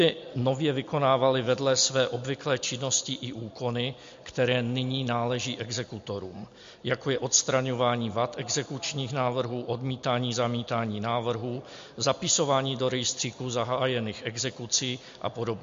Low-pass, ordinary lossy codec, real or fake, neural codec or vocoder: 7.2 kHz; MP3, 48 kbps; real; none